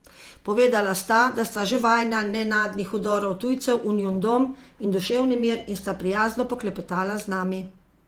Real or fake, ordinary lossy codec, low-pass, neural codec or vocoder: fake; Opus, 24 kbps; 19.8 kHz; vocoder, 48 kHz, 128 mel bands, Vocos